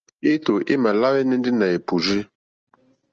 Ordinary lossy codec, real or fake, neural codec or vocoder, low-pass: Opus, 24 kbps; real; none; 7.2 kHz